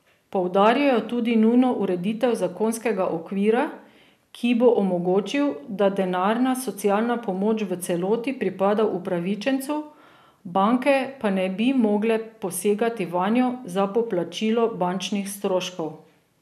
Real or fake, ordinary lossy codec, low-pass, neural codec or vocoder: real; none; 14.4 kHz; none